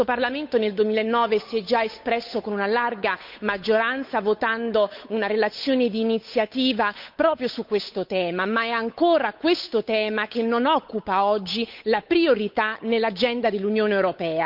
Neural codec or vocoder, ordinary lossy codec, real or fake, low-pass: codec, 16 kHz, 8 kbps, FunCodec, trained on Chinese and English, 25 frames a second; none; fake; 5.4 kHz